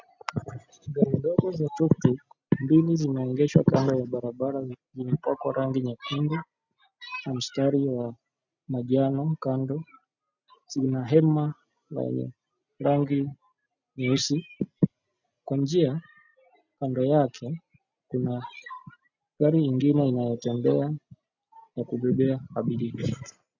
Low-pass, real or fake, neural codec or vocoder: 7.2 kHz; real; none